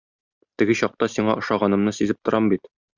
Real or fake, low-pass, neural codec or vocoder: real; 7.2 kHz; none